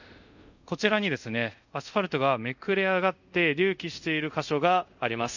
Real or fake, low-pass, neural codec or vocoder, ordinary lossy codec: fake; 7.2 kHz; codec, 24 kHz, 0.5 kbps, DualCodec; none